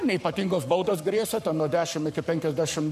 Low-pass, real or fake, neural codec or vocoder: 14.4 kHz; fake; codec, 44.1 kHz, 7.8 kbps, Pupu-Codec